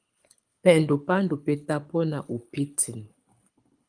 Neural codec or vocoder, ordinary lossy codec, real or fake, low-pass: codec, 24 kHz, 6 kbps, HILCodec; Opus, 32 kbps; fake; 9.9 kHz